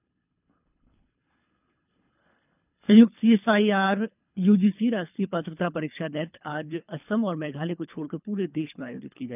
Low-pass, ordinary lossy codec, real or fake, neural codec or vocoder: 3.6 kHz; none; fake; codec, 24 kHz, 3 kbps, HILCodec